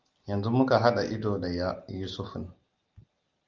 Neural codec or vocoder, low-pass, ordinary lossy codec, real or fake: none; 7.2 kHz; Opus, 32 kbps; real